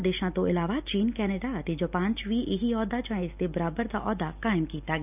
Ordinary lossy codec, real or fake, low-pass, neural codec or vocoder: none; real; 3.6 kHz; none